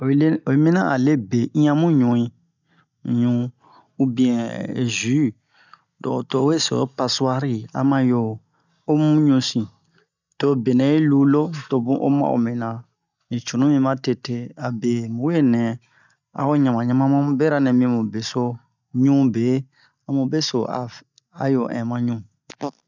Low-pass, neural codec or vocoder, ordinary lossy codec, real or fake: 7.2 kHz; none; none; real